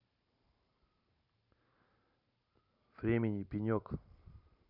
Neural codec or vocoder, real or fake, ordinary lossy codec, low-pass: vocoder, 44.1 kHz, 128 mel bands every 512 samples, BigVGAN v2; fake; none; 5.4 kHz